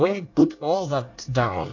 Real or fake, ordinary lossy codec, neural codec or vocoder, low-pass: fake; AAC, 48 kbps; codec, 24 kHz, 1 kbps, SNAC; 7.2 kHz